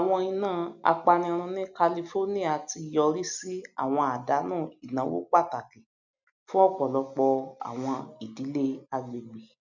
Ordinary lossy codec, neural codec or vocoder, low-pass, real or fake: none; none; 7.2 kHz; real